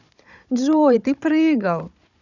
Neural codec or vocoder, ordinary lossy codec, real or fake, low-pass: codec, 16 kHz, 16 kbps, FunCodec, trained on Chinese and English, 50 frames a second; none; fake; 7.2 kHz